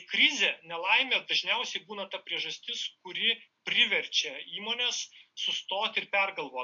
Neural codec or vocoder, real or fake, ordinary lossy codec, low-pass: none; real; AAC, 64 kbps; 7.2 kHz